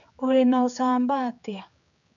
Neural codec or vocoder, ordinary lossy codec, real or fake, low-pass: codec, 16 kHz, 4 kbps, X-Codec, HuBERT features, trained on general audio; none; fake; 7.2 kHz